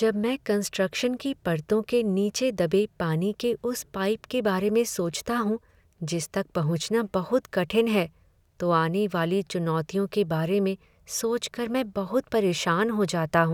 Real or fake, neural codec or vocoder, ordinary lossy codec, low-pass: real; none; none; 19.8 kHz